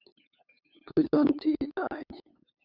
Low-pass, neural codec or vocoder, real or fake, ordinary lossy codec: 5.4 kHz; codec, 16 kHz, 8 kbps, FunCodec, trained on LibriTTS, 25 frames a second; fake; Opus, 64 kbps